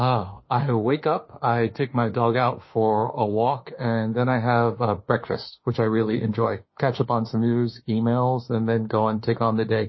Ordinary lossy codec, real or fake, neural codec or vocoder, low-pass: MP3, 24 kbps; fake; autoencoder, 48 kHz, 32 numbers a frame, DAC-VAE, trained on Japanese speech; 7.2 kHz